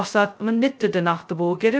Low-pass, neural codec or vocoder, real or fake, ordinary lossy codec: none; codec, 16 kHz, 0.2 kbps, FocalCodec; fake; none